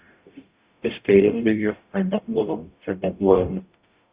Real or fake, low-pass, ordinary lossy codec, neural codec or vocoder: fake; 3.6 kHz; Opus, 64 kbps; codec, 44.1 kHz, 0.9 kbps, DAC